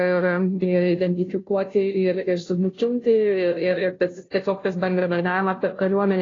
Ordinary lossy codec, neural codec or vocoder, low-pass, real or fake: AAC, 32 kbps; codec, 16 kHz, 0.5 kbps, FunCodec, trained on Chinese and English, 25 frames a second; 7.2 kHz; fake